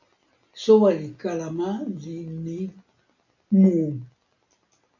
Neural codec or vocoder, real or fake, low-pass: none; real; 7.2 kHz